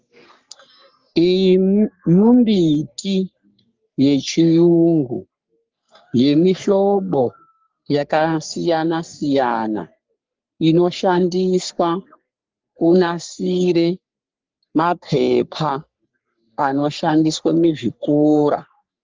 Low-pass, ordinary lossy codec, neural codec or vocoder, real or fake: 7.2 kHz; Opus, 32 kbps; codec, 44.1 kHz, 3.4 kbps, Pupu-Codec; fake